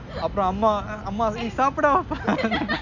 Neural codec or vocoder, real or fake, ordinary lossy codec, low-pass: none; real; none; 7.2 kHz